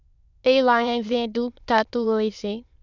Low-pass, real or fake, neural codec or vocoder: 7.2 kHz; fake; autoencoder, 22.05 kHz, a latent of 192 numbers a frame, VITS, trained on many speakers